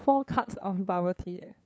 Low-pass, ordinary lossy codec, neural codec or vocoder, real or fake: none; none; codec, 16 kHz, 2 kbps, FunCodec, trained on LibriTTS, 25 frames a second; fake